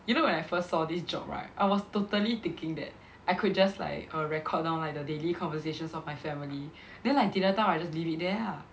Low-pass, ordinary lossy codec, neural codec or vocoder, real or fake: none; none; none; real